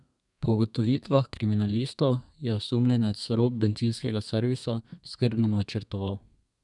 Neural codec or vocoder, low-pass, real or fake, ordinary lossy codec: codec, 32 kHz, 1.9 kbps, SNAC; 10.8 kHz; fake; none